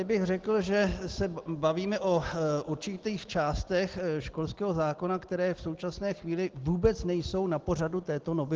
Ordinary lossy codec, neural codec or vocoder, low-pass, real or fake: Opus, 16 kbps; none; 7.2 kHz; real